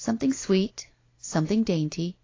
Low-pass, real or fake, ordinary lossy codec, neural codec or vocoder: 7.2 kHz; real; AAC, 32 kbps; none